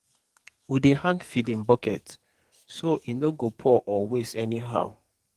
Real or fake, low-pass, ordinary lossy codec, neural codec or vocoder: fake; 14.4 kHz; Opus, 24 kbps; codec, 44.1 kHz, 2.6 kbps, SNAC